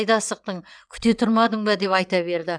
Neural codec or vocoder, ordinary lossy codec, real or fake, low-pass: vocoder, 22.05 kHz, 80 mel bands, WaveNeXt; none; fake; 9.9 kHz